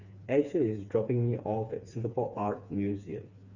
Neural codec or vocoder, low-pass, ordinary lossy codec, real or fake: codec, 16 kHz, 4 kbps, FreqCodec, smaller model; 7.2 kHz; Opus, 64 kbps; fake